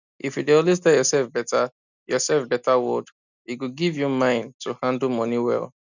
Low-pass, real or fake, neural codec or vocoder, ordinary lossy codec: 7.2 kHz; real; none; none